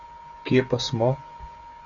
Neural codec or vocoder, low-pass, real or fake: codec, 16 kHz, 16 kbps, FreqCodec, smaller model; 7.2 kHz; fake